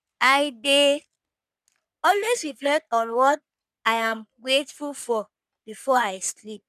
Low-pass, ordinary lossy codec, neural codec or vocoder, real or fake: 14.4 kHz; none; codec, 44.1 kHz, 3.4 kbps, Pupu-Codec; fake